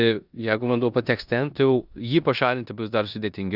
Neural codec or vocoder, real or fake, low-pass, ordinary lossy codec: codec, 16 kHz in and 24 kHz out, 0.9 kbps, LongCat-Audio-Codec, four codebook decoder; fake; 5.4 kHz; Opus, 64 kbps